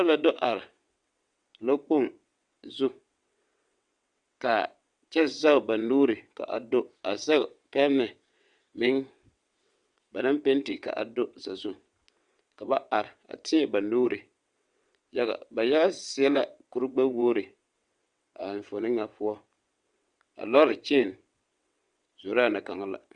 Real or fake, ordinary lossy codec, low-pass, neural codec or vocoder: fake; Opus, 64 kbps; 9.9 kHz; vocoder, 22.05 kHz, 80 mel bands, WaveNeXt